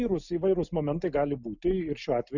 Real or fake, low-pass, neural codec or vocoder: real; 7.2 kHz; none